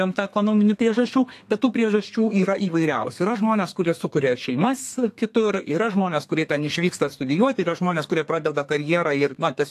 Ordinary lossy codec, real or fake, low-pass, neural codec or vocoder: AAC, 64 kbps; fake; 14.4 kHz; codec, 32 kHz, 1.9 kbps, SNAC